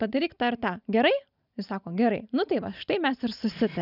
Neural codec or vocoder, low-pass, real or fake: none; 5.4 kHz; real